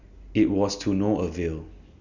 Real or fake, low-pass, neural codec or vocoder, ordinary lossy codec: real; 7.2 kHz; none; none